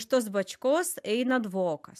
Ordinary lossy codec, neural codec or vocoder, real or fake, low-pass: AAC, 96 kbps; none; real; 14.4 kHz